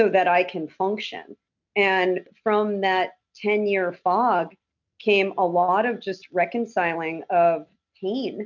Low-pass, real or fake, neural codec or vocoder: 7.2 kHz; real; none